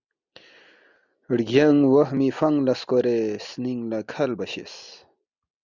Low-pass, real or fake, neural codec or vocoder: 7.2 kHz; real; none